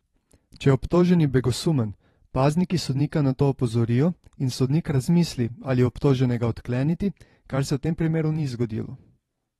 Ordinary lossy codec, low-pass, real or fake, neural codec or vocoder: AAC, 32 kbps; 19.8 kHz; fake; vocoder, 44.1 kHz, 128 mel bands every 512 samples, BigVGAN v2